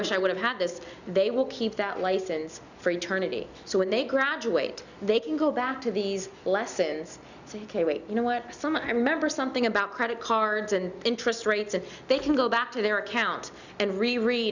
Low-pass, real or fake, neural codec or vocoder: 7.2 kHz; real; none